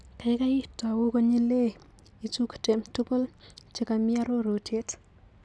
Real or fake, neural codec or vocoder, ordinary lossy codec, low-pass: real; none; none; none